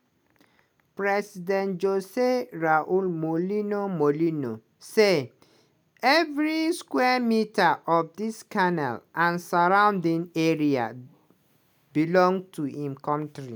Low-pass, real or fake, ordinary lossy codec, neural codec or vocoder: none; real; none; none